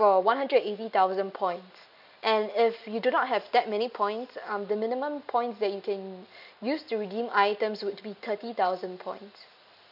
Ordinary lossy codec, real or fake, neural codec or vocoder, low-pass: none; real; none; 5.4 kHz